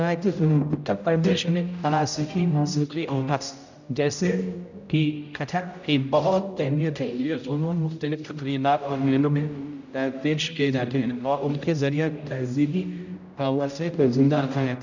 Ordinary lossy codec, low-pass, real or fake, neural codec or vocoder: none; 7.2 kHz; fake; codec, 16 kHz, 0.5 kbps, X-Codec, HuBERT features, trained on general audio